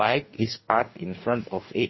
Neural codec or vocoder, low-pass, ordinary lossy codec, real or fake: codec, 44.1 kHz, 2.6 kbps, DAC; 7.2 kHz; MP3, 24 kbps; fake